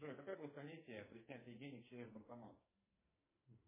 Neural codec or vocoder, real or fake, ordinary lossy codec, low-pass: codec, 44.1 kHz, 2.6 kbps, SNAC; fake; MP3, 16 kbps; 3.6 kHz